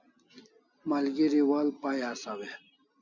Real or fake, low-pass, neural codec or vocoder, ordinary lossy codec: real; 7.2 kHz; none; MP3, 64 kbps